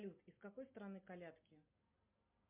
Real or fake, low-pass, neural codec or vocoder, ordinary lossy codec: real; 3.6 kHz; none; MP3, 32 kbps